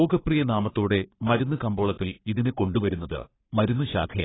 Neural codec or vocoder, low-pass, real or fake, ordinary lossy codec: codec, 44.1 kHz, 3.4 kbps, Pupu-Codec; 7.2 kHz; fake; AAC, 16 kbps